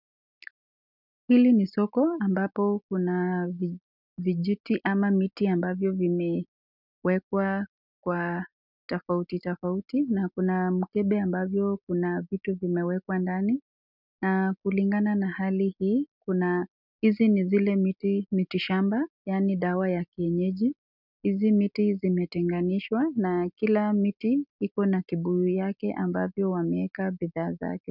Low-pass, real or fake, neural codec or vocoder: 5.4 kHz; real; none